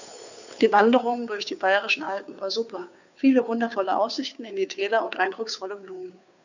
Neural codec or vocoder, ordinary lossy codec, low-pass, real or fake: codec, 16 kHz, 2 kbps, FunCodec, trained on Chinese and English, 25 frames a second; none; 7.2 kHz; fake